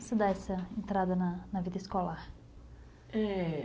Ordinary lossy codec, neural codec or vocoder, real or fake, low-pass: none; none; real; none